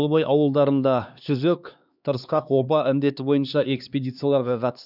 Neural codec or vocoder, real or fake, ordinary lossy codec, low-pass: codec, 16 kHz, 2 kbps, X-Codec, HuBERT features, trained on LibriSpeech; fake; none; 5.4 kHz